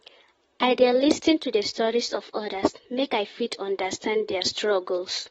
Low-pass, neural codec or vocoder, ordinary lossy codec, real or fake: 19.8 kHz; vocoder, 44.1 kHz, 128 mel bands, Pupu-Vocoder; AAC, 24 kbps; fake